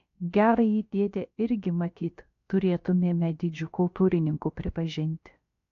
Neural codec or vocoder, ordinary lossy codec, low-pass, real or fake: codec, 16 kHz, about 1 kbps, DyCAST, with the encoder's durations; AAC, 48 kbps; 7.2 kHz; fake